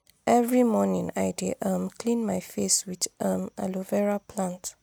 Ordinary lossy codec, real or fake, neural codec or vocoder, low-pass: none; real; none; none